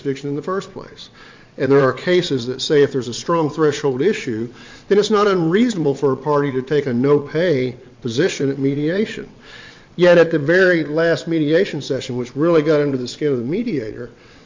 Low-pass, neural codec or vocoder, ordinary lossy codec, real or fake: 7.2 kHz; vocoder, 22.05 kHz, 80 mel bands, WaveNeXt; MP3, 48 kbps; fake